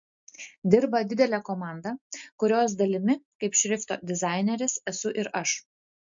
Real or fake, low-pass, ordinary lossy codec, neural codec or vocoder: real; 7.2 kHz; MP3, 48 kbps; none